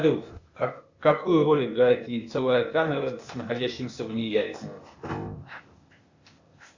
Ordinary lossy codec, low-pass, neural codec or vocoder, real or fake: Opus, 64 kbps; 7.2 kHz; codec, 16 kHz, 0.8 kbps, ZipCodec; fake